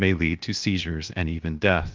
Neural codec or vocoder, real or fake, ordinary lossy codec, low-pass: codec, 16 kHz, 0.7 kbps, FocalCodec; fake; Opus, 32 kbps; 7.2 kHz